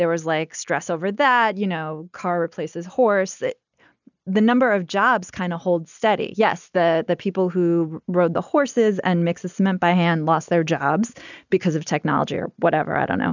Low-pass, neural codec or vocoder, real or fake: 7.2 kHz; none; real